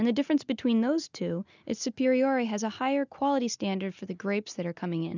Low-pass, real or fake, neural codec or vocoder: 7.2 kHz; real; none